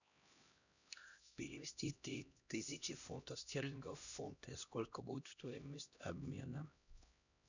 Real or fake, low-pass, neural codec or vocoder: fake; 7.2 kHz; codec, 16 kHz, 1 kbps, X-Codec, HuBERT features, trained on LibriSpeech